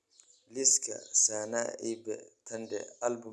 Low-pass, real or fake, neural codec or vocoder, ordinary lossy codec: none; real; none; none